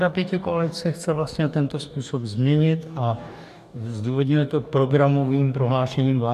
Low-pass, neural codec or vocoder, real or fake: 14.4 kHz; codec, 44.1 kHz, 2.6 kbps, DAC; fake